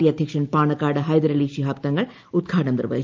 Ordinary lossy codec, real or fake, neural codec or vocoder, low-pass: Opus, 32 kbps; real; none; 7.2 kHz